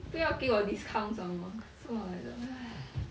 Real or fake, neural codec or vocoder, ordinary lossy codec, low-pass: real; none; none; none